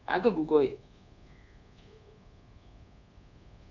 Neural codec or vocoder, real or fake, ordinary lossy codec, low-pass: codec, 24 kHz, 1.2 kbps, DualCodec; fake; none; 7.2 kHz